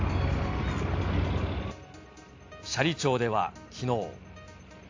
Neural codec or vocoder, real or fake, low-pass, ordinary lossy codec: none; real; 7.2 kHz; AAC, 48 kbps